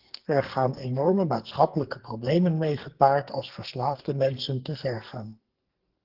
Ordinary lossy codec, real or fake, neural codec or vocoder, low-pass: Opus, 16 kbps; fake; codec, 44.1 kHz, 2.6 kbps, SNAC; 5.4 kHz